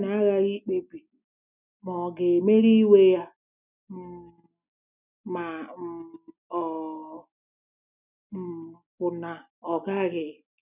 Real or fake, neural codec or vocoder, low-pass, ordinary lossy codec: real; none; 3.6 kHz; none